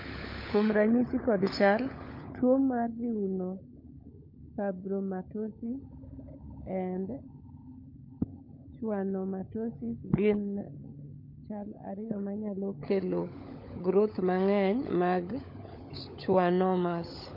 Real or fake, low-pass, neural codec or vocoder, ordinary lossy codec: fake; 5.4 kHz; codec, 16 kHz, 16 kbps, FunCodec, trained on LibriTTS, 50 frames a second; MP3, 32 kbps